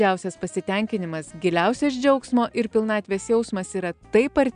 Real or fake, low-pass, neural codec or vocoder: real; 9.9 kHz; none